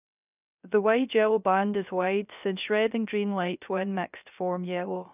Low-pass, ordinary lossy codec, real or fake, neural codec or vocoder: 3.6 kHz; none; fake; codec, 16 kHz, 0.3 kbps, FocalCodec